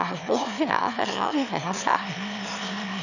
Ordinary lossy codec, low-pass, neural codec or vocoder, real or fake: none; 7.2 kHz; autoencoder, 22.05 kHz, a latent of 192 numbers a frame, VITS, trained on one speaker; fake